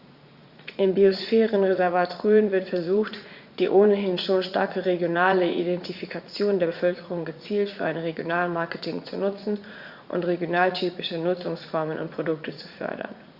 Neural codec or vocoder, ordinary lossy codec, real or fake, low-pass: vocoder, 44.1 kHz, 80 mel bands, Vocos; Opus, 64 kbps; fake; 5.4 kHz